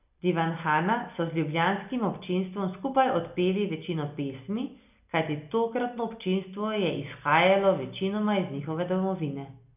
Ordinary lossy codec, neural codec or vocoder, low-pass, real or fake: none; none; 3.6 kHz; real